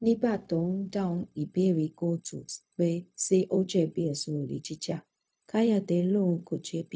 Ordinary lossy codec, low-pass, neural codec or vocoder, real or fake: none; none; codec, 16 kHz, 0.4 kbps, LongCat-Audio-Codec; fake